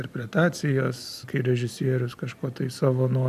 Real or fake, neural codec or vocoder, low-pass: real; none; 14.4 kHz